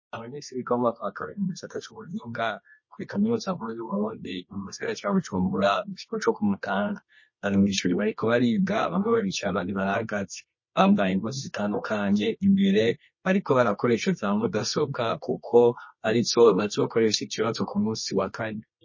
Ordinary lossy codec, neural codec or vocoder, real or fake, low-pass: MP3, 32 kbps; codec, 24 kHz, 0.9 kbps, WavTokenizer, medium music audio release; fake; 7.2 kHz